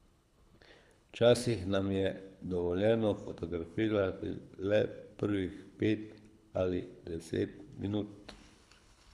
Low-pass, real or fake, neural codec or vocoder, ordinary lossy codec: none; fake; codec, 24 kHz, 6 kbps, HILCodec; none